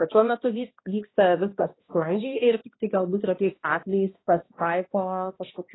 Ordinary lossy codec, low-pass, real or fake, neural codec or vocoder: AAC, 16 kbps; 7.2 kHz; fake; codec, 16 kHz, 1 kbps, X-Codec, HuBERT features, trained on balanced general audio